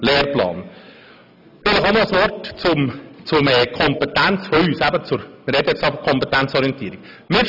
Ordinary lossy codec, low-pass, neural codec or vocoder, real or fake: none; 5.4 kHz; none; real